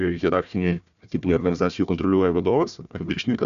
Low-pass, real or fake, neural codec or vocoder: 7.2 kHz; fake; codec, 16 kHz, 1 kbps, FunCodec, trained on Chinese and English, 50 frames a second